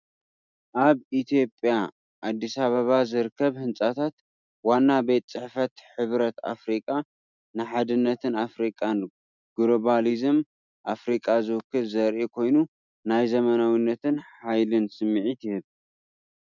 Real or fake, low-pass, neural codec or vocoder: real; 7.2 kHz; none